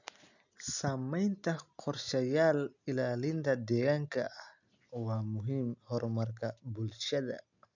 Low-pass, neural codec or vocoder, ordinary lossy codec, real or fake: 7.2 kHz; none; none; real